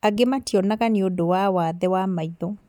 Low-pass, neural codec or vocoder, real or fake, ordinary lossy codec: 19.8 kHz; none; real; none